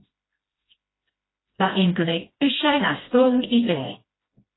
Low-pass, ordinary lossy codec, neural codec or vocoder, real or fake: 7.2 kHz; AAC, 16 kbps; codec, 16 kHz, 1 kbps, FreqCodec, smaller model; fake